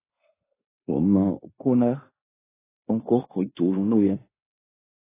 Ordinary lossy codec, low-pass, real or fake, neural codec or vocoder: AAC, 16 kbps; 3.6 kHz; fake; codec, 16 kHz in and 24 kHz out, 0.9 kbps, LongCat-Audio-Codec, fine tuned four codebook decoder